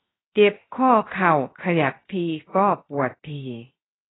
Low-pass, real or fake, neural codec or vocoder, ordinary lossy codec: 7.2 kHz; fake; codec, 16 kHz, 0.7 kbps, FocalCodec; AAC, 16 kbps